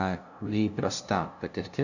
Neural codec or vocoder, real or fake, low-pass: codec, 16 kHz, 0.5 kbps, FunCodec, trained on LibriTTS, 25 frames a second; fake; 7.2 kHz